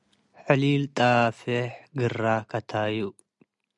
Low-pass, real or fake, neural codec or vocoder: 10.8 kHz; real; none